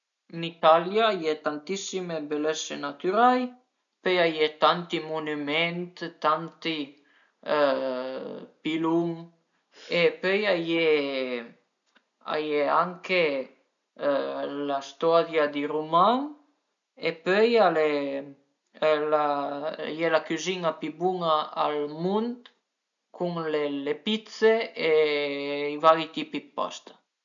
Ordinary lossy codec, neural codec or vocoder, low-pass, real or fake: none; none; 7.2 kHz; real